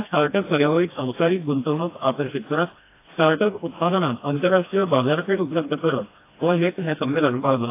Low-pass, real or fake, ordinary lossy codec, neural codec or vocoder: 3.6 kHz; fake; AAC, 24 kbps; codec, 16 kHz, 1 kbps, FreqCodec, smaller model